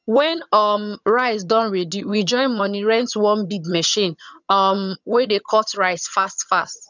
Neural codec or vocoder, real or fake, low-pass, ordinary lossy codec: vocoder, 22.05 kHz, 80 mel bands, HiFi-GAN; fake; 7.2 kHz; none